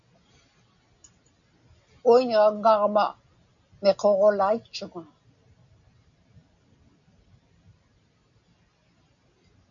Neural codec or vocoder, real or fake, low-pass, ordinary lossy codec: none; real; 7.2 kHz; MP3, 96 kbps